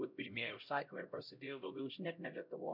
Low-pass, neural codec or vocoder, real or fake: 5.4 kHz; codec, 16 kHz, 0.5 kbps, X-Codec, HuBERT features, trained on LibriSpeech; fake